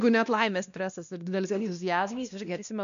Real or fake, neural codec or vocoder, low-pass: fake; codec, 16 kHz, 1 kbps, X-Codec, WavLM features, trained on Multilingual LibriSpeech; 7.2 kHz